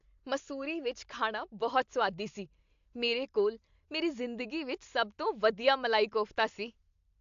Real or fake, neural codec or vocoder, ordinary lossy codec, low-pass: real; none; AAC, 64 kbps; 7.2 kHz